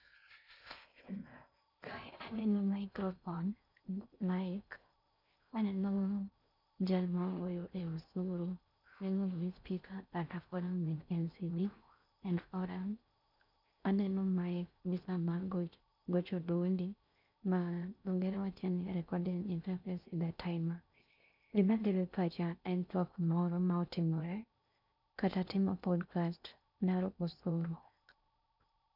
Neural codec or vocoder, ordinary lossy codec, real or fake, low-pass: codec, 16 kHz in and 24 kHz out, 0.6 kbps, FocalCodec, streaming, 4096 codes; none; fake; 5.4 kHz